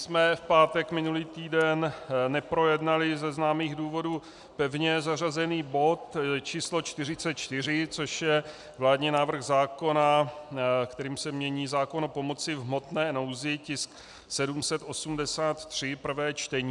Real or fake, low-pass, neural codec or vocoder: real; 10.8 kHz; none